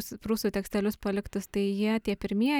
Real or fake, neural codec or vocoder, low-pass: real; none; 19.8 kHz